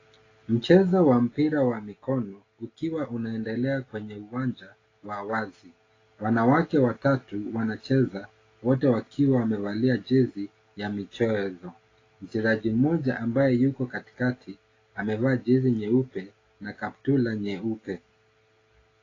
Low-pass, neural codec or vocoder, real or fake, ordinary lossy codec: 7.2 kHz; none; real; AAC, 32 kbps